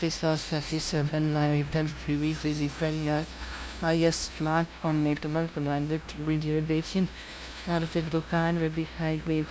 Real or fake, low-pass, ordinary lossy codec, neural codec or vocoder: fake; none; none; codec, 16 kHz, 0.5 kbps, FunCodec, trained on LibriTTS, 25 frames a second